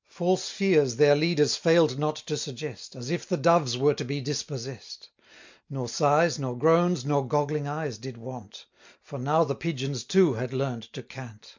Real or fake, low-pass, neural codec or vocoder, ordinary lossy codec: real; 7.2 kHz; none; MP3, 64 kbps